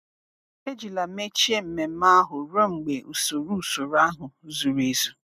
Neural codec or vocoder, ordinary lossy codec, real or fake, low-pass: none; none; real; 14.4 kHz